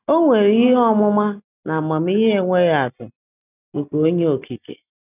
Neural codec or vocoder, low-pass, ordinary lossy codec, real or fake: none; 3.6 kHz; none; real